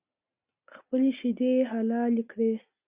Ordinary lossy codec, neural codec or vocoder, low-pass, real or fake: Opus, 64 kbps; none; 3.6 kHz; real